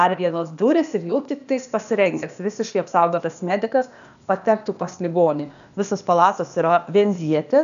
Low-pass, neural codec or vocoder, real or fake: 7.2 kHz; codec, 16 kHz, 0.8 kbps, ZipCodec; fake